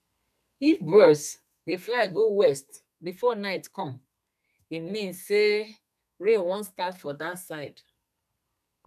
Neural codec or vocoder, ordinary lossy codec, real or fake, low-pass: codec, 32 kHz, 1.9 kbps, SNAC; none; fake; 14.4 kHz